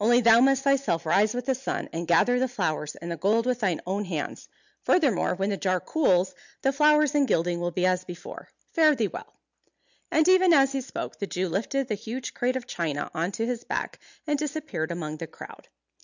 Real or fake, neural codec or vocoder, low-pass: real; none; 7.2 kHz